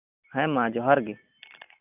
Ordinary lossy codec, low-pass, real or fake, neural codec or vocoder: none; 3.6 kHz; real; none